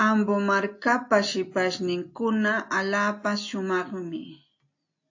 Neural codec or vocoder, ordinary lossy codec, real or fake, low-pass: none; AAC, 48 kbps; real; 7.2 kHz